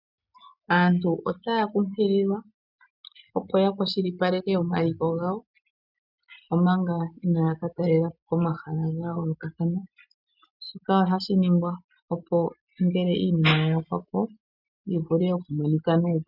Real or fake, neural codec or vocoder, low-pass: real; none; 5.4 kHz